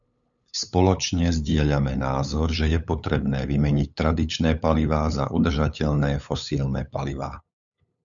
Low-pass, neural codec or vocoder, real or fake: 7.2 kHz; codec, 16 kHz, 8 kbps, FunCodec, trained on LibriTTS, 25 frames a second; fake